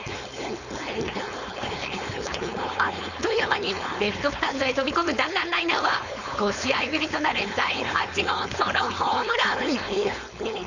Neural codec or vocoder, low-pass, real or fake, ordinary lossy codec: codec, 16 kHz, 4.8 kbps, FACodec; 7.2 kHz; fake; none